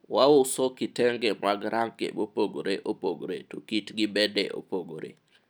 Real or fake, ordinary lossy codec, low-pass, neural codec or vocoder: real; none; none; none